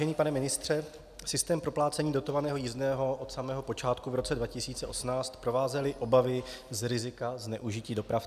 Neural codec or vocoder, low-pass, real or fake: none; 14.4 kHz; real